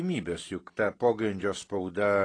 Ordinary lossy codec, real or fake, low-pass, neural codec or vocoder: AAC, 32 kbps; real; 9.9 kHz; none